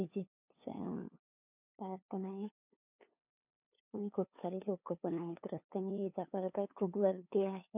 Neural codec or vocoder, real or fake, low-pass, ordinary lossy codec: codec, 16 kHz, 2 kbps, FunCodec, trained on LibriTTS, 25 frames a second; fake; 3.6 kHz; none